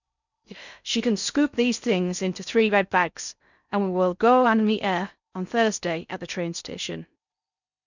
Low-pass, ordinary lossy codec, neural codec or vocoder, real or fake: 7.2 kHz; none; codec, 16 kHz in and 24 kHz out, 0.6 kbps, FocalCodec, streaming, 4096 codes; fake